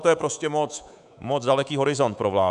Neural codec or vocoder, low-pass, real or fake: codec, 24 kHz, 3.1 kbps, DualCodec; 10.8 kHz; fake